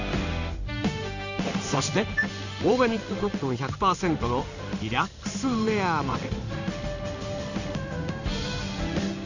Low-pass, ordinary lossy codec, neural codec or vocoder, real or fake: 7.2 kHz; none; codec, 16 kHz in and 24 kHz out, 1 kbps, XY-Tokenizer; fake